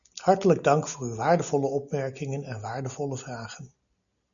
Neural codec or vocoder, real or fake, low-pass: none; real; 7.2 kHz